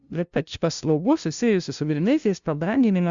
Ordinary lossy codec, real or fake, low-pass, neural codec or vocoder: Opus, 64 kbps; fake; 7.2 kHz; codec, 16 kHz, 0.5 kbps, FunCodec, trained on LibriTTS, 25 frames a second